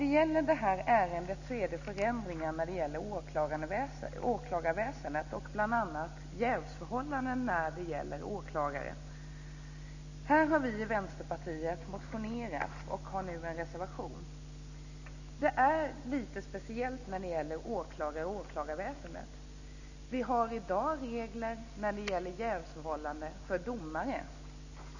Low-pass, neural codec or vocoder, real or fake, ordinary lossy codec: 7.2 kHz; none; real; none